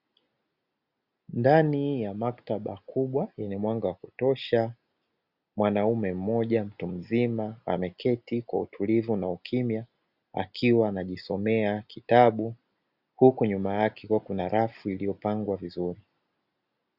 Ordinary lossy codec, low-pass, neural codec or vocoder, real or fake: Opus, 64 kbps; 5.4 kHz; none; real